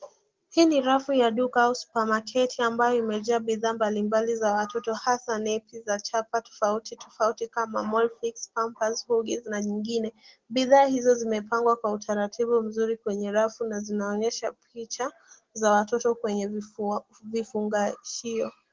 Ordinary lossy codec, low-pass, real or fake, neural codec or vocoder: Opus, 16 kbps; 7.2 kHz; real; none